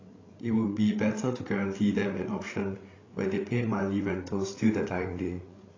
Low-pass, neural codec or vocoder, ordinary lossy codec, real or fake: 7.2 kHz; codec, 16 kHz, 8 kbps, FreqCodec, larger model; AAC, 32 kbps; fake